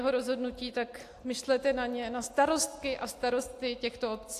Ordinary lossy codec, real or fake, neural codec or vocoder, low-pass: AAC, 64 kbps; real; none; 14.4 kHz